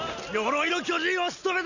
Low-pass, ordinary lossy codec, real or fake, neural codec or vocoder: 7.2 kHz; none; real; none